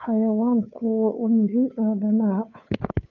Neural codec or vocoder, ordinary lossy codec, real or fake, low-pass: codec, 16 kHz, 2 kbps, FunCodec, trained on Chinese and English, 25 frames a second; none; fake; 7.2 kHz